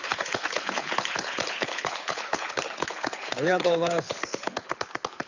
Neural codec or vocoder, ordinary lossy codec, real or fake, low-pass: codec, 24 kHz, 6 kbps, HILCodec; none; fake; 7.2 kHz